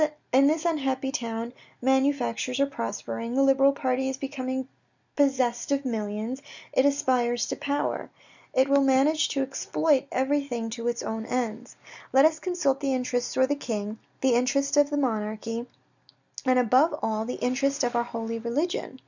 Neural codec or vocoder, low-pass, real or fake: none; 7.2 kHz; real